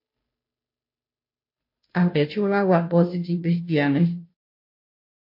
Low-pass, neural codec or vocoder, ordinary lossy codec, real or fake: 5.4 kHz; codec, 16 kHz, 0.5 kbps, FunCodec, trained on Chinese and English, 25 frames a second; MP3, 32 kbps; fake